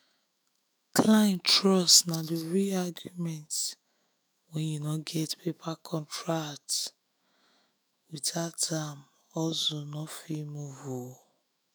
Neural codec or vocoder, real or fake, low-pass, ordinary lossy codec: autoencoder, 48 kHz, 128 numbers a frame, DAC-VAE, trained on Japanese speech; fake; none; none